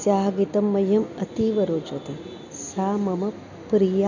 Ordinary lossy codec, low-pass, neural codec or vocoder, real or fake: none; 7.2 kHz; none; real